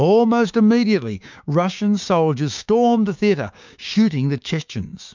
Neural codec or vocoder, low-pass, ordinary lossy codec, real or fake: codec, 16 kHz, 6 kbps, DAC; 7.2 kHz; MP3, 64 kbps; fake